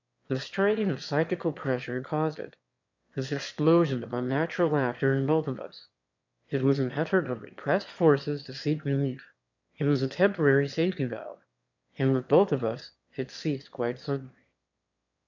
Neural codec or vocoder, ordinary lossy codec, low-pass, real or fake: autoencoder, 22.05 kHz, a latent of 192 numbers a frame, VITS, trained on one speaker; MP3, 64 kbps; 7.2 kHz; fake